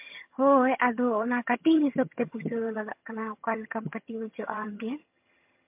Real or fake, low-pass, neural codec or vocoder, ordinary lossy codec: fake; 3.6 kHz; vocoder, 22.05 kHz, 80 mel bands, HiFi-GAN; MP3, 32 kbps